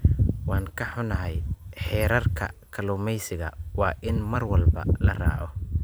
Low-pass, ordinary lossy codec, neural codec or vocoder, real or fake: none; none; vocoder, 44.1 kHz, 128 mel bands every 256 samples, BigVGAN v2; fake